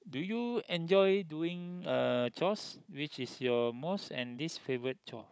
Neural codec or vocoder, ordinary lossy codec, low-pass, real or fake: codec, 16 kHz, 16 kbps, FunCodec, trained on Chinese and English, 50 frames a second; none; none; fake